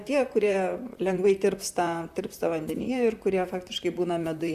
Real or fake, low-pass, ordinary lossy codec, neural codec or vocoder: fake; 14.4 kHz; Opus, 64 kbps; vocoder, 44.1 kHz, 128 mel bands, Pupu-Vocoder